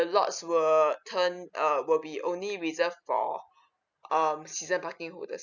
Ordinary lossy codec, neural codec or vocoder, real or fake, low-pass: none; none; real; 7.2 kHz